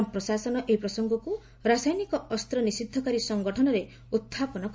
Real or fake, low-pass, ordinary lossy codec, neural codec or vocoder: real; none; none; none